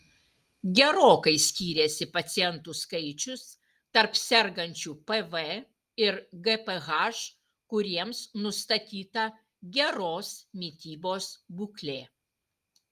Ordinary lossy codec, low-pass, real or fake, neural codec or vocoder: Opus, 32 kbps; 14.4 kHz; real; none